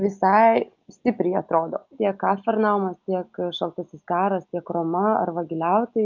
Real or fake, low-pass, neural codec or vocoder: real; 7.2 kHz; none